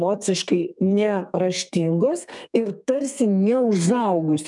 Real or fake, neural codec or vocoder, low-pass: fake; codec, 32 kHz, 1.9 kbps, SNAC; 10.8 kHz